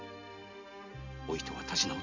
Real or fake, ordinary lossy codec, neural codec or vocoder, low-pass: real; none; none; 7.2 kHz